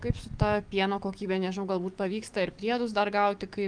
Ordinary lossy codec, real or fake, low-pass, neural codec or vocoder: Opus, 32 kbps; fake; 9.9 kHz; codec, 44.1 kHz, 7.8 kbps, DAC